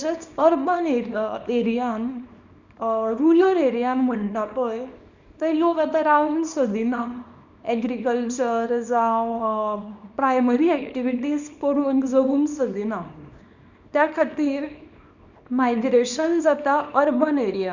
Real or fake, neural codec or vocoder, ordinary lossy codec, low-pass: fake; codec, 24 kHz, 0.9 kbps, WavTokenizer, small release; none; 7.2 kHz